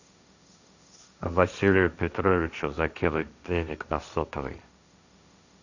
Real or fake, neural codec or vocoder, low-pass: fake; codec, 16 kHz, 1.1 kbps, Voila-Tokenizer; 7.2 kHz